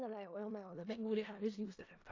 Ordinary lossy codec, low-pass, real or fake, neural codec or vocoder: AAC, 32 kbps; 7.2 kHz; fake; codec, 16 kHz in and 24 kHz out, 0.4 kbps, LongCat-Audio-Codec, four codebook decoder